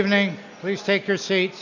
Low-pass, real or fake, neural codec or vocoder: 7.2 kHz; real; none